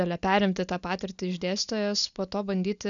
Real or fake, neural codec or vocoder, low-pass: real; none; 7.2 kHz